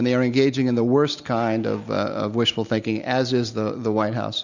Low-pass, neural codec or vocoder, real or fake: 7.2 kHz; none; real